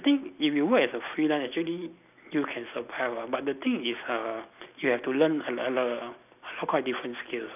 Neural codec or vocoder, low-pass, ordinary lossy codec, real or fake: none; 3.6 kHz; none; real